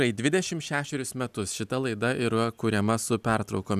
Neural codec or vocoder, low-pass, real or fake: none; 14.4 kHz; real